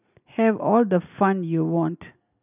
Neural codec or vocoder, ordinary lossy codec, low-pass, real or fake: none; none; 3.6 kHz; real